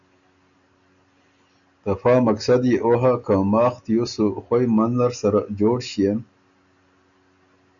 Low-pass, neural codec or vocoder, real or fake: 7.2 kHz; none; real